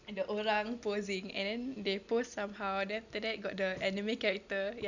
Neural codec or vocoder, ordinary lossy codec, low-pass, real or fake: none; none; 7.2 kHz; real